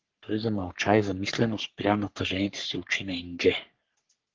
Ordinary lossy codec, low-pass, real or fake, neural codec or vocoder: Opus, 16 kbps; 7.2 kHz; fake; codec, 44.1 kHz, 3.4 kbps, Pupu-Codec